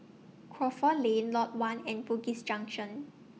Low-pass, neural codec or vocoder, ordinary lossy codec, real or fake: none; none; none; real